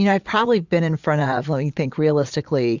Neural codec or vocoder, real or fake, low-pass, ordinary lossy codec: vocoder, 22.05 kHz, 80 mel bands, Vocos; fake; 7.2 kHz; Opus, 64 kbps